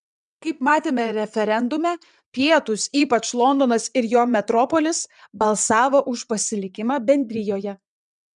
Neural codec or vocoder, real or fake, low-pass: vocoder, 22.05 kHz, 80 mel bands, WaveNeXt; fake; 9.9 kHz